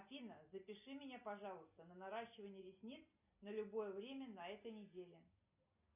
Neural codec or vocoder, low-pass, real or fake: none; 3.6 kHz; real